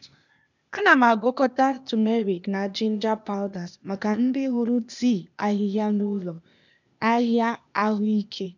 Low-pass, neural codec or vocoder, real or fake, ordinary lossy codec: 7.2 kHz; codec, 16 kHz, 0.8 kbps, ZipCodec; fake; none